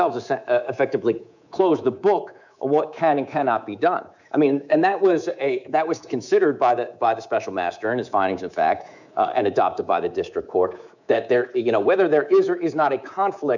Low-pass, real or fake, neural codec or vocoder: 7.2 kHz; fake; codec, 24 kHz, 3.1 kbps, DualCodec